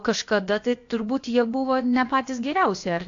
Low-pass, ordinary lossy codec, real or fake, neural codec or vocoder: 7.2 kHz; AAC, 48 kbps; fake; codec, 16 kHz, about 1 kbps, DyCAST, with the encoder's durations